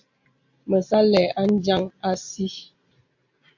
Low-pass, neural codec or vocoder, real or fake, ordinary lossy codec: 7.2 kHz; none; real; MP3, 48 kbps